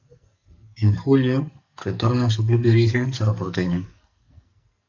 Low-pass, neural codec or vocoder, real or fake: 7.2 kHz; codec, 44.1 kHz, 2.6 kbps, SNAC; fake